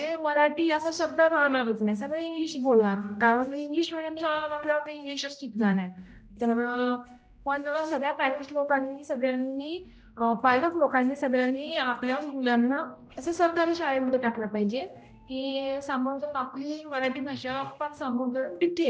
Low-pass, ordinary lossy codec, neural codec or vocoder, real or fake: none; none; codec, 16 kHz, 0.5 kbps, X-Codec, HuBERT features, trained on general audio; fake